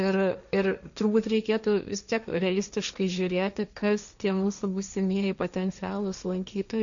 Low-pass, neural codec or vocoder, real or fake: 7.2 kHz; codec, 16 kHz, 1.1 kbps, Voila-Tokenizer; fake